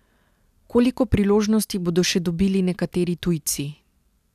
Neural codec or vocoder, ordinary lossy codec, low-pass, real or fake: none; none; 14.4 kHz; real